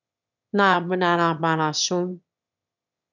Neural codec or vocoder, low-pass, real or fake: autoencoder, 22.05 kHz, a latent of 192 numbers a frame, VITS, trained on one speaker; 7.2 kHz; fake